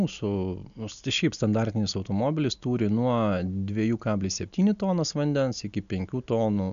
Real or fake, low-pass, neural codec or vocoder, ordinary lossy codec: real; 7.2 kHz; none; MP3, 96 kbps